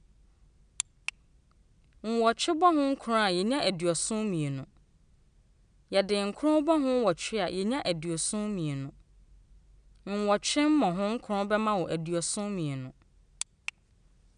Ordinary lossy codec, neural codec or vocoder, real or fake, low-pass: none; none; real; 9.9 kHz